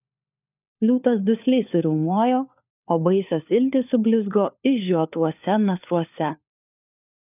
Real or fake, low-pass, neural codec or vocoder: fake; 3.6 kHz; codec, 16 kHz, 4 kbps, FunCodec, trained on LibriTTS, 50 frames a second